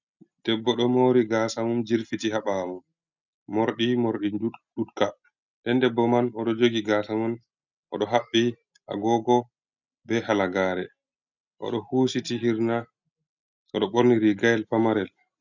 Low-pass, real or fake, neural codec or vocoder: 7.2 kHz; real; none